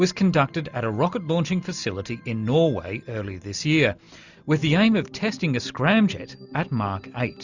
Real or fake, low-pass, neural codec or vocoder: real; 7.2 kHz; none